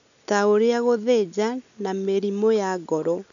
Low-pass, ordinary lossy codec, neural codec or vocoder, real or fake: 7.2 kHz; none; none; real